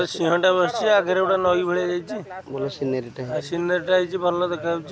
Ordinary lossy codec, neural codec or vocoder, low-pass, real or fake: none; none; none; real